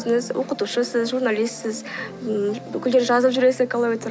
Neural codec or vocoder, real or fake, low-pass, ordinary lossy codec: none; real; none; none